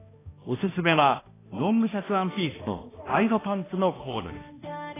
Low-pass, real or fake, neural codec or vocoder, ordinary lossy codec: 3.6 kHz; fake; codec, 16 kHz, 1 kbps, X-Codec, HuBERT features, trained on balanced general audio; AAC, 16 kbps